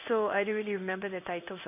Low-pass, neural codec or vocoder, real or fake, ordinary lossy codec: 3.6 kHz; codec, 16 kHz in and 24 kHz out, 1 kbps, XY-Tokenizer; fake; none